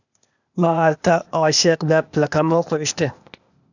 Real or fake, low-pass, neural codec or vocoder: fake; 7.2 kHz; codec, 16 kHz, 0.8 kbps, ZipCodec